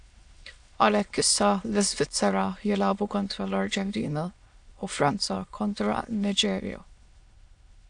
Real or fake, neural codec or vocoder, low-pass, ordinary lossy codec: fake; autoencoder, 22.05 kHz, a latent of 192 numbers a frame, VITS, trained on many speakers; 9.9 kHz; AAC, 64 kbps